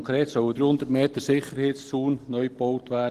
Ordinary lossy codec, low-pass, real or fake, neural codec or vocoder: Opus, 16 kbps; 14.4 kHz; real; none